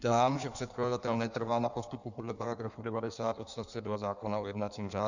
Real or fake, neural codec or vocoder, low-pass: fake; codec, 16 kHz in and 24 kHz out, 1.1 kbps, FireRedTTS-2 codec; 7.2 kHz